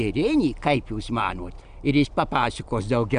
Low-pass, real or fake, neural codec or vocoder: 9.9 kHz; real; none